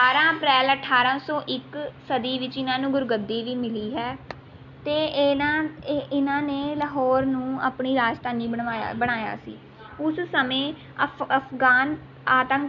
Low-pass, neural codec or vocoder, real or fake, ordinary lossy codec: 7.2 kHz; none; real; none